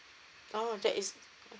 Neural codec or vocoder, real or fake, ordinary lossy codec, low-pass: none; real; none; none